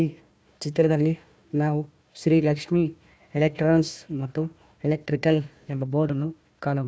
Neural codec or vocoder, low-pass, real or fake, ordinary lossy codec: codec, 16 kHz, 1 kbps, FunCodec, trained on Chinese and English, 50 frames a second; none; fake; none